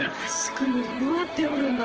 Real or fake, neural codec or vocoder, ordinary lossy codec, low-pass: fake; vocoder, 44.1 kHz, 128 mel bands, Pupu-Vocoder; Opus, 16 kbps; 7.2 kHz